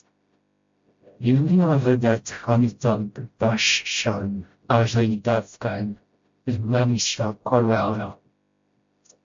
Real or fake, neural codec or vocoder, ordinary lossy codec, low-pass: fake; codec, 16 kHz, 0.5 kbps, FreqCodec, smaller model; MP3, 48 kbps; 7.2 kHz